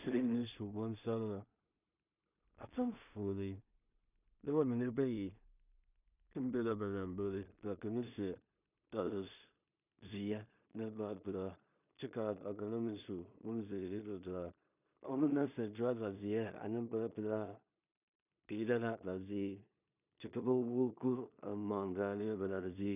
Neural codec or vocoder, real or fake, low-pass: codec, 16 kHz in and 24 kHz out, 0.4 kbps, LongCat-Audio-Codec, two codebook decoder; fake; 3.6 kHz